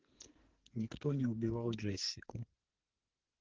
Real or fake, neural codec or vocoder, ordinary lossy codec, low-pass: fake; codec, 44.1 kHz, 2.6 kbps, SNAC; Opus, 16 kbps; 7.2 kHz